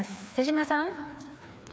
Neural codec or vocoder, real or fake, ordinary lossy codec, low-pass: codec, 16 kHz, 2 kbps, FreqCodec, larger model; fake; none; none